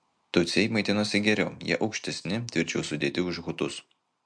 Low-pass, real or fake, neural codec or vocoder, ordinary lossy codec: 9.9 kHz; real; none; MP3, 64 kbps